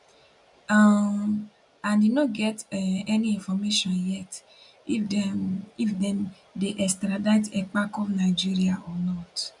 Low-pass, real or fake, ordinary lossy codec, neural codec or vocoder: 10.8 kHz; real; none; none